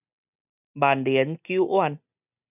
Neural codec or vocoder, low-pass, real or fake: none; 3.6 kHz; real